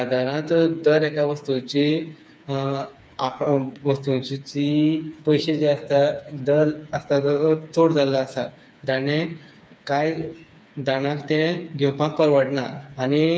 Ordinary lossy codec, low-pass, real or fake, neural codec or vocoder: none; none; fake; codec, 16 kHz, 4 kbps, FreqCodec, smaller model